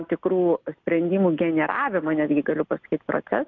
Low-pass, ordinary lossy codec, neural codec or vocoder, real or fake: 7.2 kHz; MP3, 64 kbps; none; real